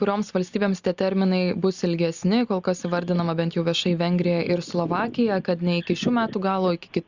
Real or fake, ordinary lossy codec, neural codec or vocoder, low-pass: real; Opus, 64 kbps; none; 7.2 kHz